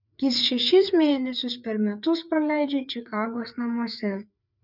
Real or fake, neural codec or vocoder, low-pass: fake; codec, 16 kHz, 4 kbps, FreqCodec, larger model; 5.4 kHz